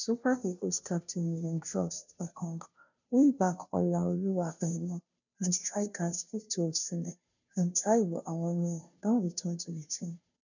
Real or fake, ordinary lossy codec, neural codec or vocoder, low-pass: fake; none; codec, 16 kHz, 0.5 kbps, FunCodec, trained on Chinese and English, 25 frames a second; 7.2 kHz